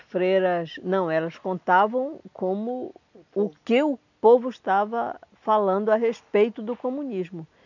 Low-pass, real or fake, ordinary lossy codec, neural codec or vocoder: 7.2 kHz; real; none; none